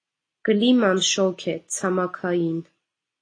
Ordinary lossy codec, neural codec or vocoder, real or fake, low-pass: AAC, 32 kbps; none; real; 9.9 kHz